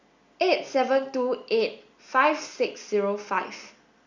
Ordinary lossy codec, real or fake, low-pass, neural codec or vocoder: Opus, 64 kbps; real; 7.2 kHz; none